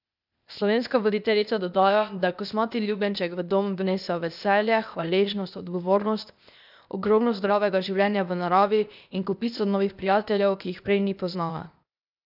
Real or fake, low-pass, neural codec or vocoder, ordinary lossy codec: fake; 5.4 kHz; codec, 16 kHz, 0.8 kbps, ZipCodec; none